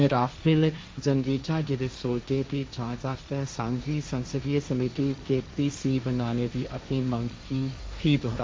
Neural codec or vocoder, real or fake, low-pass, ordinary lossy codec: codec, 16 kHz, 1.1 kbps, Voila-Tokenizer; fake; none; none